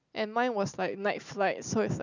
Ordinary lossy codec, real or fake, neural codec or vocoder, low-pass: none; real; none; 7.2 kHz